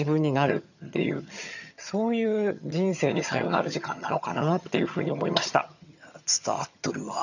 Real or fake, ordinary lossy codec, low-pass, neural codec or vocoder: fake; none; 7.2 kHz; vocoder, 22.05 kHz, 80 mel bands, HiFi-GAN